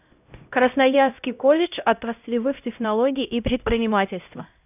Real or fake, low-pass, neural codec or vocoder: fake; 3.6 kHz; codec, 16 kHz, 0.5 kbps, X-Codec, HuBERT features, trained on LibriSpeech